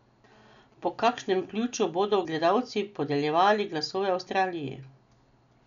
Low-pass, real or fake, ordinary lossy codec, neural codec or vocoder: 7.2 kHz; real; none; none